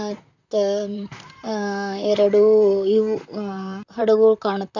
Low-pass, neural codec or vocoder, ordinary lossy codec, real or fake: 7.2 kHz; vocoder, 44.1 kHz, 128 mel bands, Pupu-Vocoder; none; fake